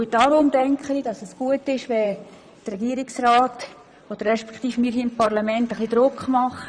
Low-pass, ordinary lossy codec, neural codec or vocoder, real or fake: 9.9 kHz; none; vocoder, 44.1 kHz, 128 mel bands, Pupu-Vocoder; fake